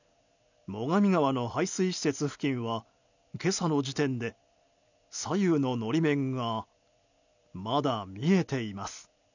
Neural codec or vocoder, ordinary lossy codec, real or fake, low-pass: none; none; real; 7.2 kHz